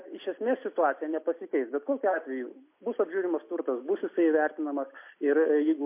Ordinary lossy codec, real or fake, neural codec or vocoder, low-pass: MP3, 24 kbps; real; none; 3.6 kHz